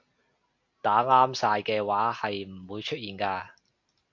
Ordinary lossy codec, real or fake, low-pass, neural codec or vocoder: MP3, 48 kbps; real; 7.2 kHz; none